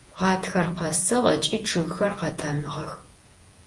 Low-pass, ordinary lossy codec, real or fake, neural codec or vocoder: 10.8 kHz; Opus, 24 kbps; fake; vocoder, 48 kHz, 128 mel bands, Vocos